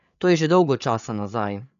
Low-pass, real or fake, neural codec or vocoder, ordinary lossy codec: 7.2 kHz; fake; codec, 16 kHz, 8 kbps, FreqCodec, larger model; none